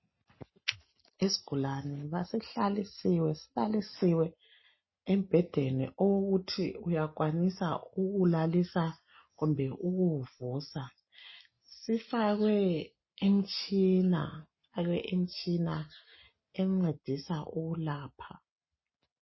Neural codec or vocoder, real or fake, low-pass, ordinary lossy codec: none; real; 7.2 kHz; MP3, 24 kbps